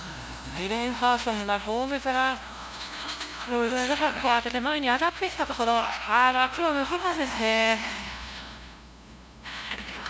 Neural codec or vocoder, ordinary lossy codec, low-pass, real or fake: codec, 16 kHz, 0.5 kbps, FunCodec, trained on LibriTTS, 25 frames a second; none; none; fake